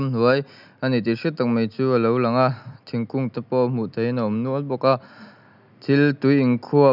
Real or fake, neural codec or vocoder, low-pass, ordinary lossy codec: real; none; 5.4 kHz; none